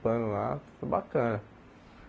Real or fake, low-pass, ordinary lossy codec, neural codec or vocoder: real; none; none; none